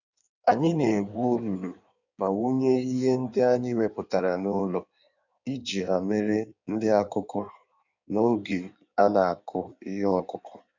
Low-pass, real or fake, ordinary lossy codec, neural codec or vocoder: 7.2 kHz; fake; none; codec, 16 kHz in and 24 kHz out, 1.1 kbps, FireRedTTS-2 codec